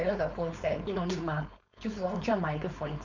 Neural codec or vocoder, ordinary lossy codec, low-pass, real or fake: codec, 16 kHz, 4.8 kbps, FACodec; none; 7.2 kHz; fake